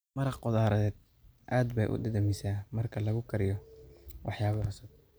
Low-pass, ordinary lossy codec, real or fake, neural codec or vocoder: none; none; real; none